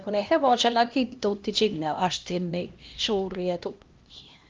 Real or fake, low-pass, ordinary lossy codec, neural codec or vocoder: fake; 7.2 kHz; Opus, 32 kbps; codec, 16 kHz, 1 kbps, X-Codec, HuBERT features, trained on LibriSpeech